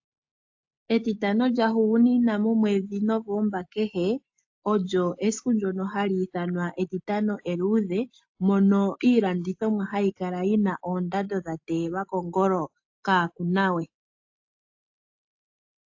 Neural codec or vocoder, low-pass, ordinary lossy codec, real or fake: none; 7.2 kHz; AAC, 48 kbps; real